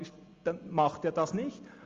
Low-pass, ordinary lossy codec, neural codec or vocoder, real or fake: 7.2 kHz; Opus, 32 kbps; none; real